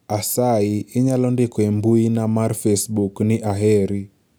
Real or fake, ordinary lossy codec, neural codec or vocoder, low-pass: real; none; none; none